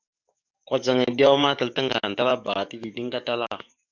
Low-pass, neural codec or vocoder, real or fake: 7.2 kHz; codec, 44.1 kHz, 7.8 kbps, DAC; fake